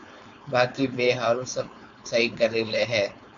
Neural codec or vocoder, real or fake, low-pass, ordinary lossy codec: codec, 16 kHz, 4.8 kbps, FACodec; fake; 7.2 kHz; MP3, 64 kbps